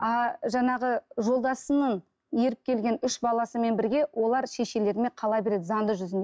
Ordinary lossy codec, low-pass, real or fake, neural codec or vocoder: none; none; real; none